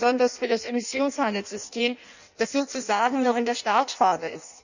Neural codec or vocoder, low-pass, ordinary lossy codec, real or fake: codec, 16 kHz in and 24 kHz out, 0.6 kbps, FireRedTTS-2 codec; 7.2 kHz; none; fake